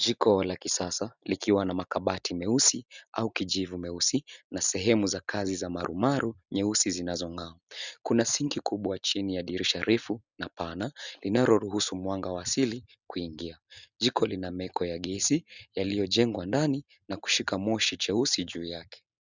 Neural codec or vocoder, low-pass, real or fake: none; 7.2 kHz; real